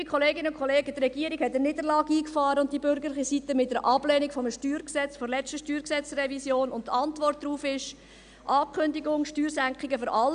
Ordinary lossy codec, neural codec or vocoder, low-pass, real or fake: none; none; 9.9 kHz; real